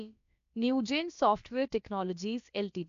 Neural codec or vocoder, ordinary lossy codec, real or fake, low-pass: codec, 16 kHz, about 1 kbps, DyCAST, with the encoder's durations; AAC, 64 kbps; fake; 7.2 kHz